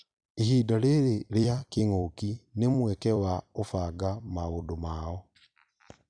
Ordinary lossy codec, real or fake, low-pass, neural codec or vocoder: none; fake; 9.9 kHz; vocoder, 44.1 kHz, 128 mel bands every 256 samples, BigVGAN v2